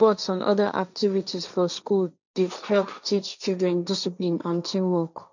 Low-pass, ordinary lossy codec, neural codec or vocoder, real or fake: 7.2 kHz; none; codec, 16 kHz, 1.1 kbps, Voila-Tokenizer; fake